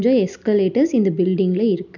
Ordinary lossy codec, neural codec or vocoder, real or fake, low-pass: none; none; real; 7.2 kHz